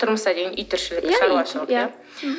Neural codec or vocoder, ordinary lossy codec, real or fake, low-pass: none; none; real; none